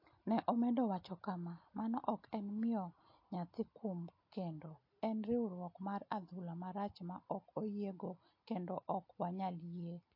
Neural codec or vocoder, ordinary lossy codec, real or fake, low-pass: none; MP3, 32 kbps; real; 5.4 kHz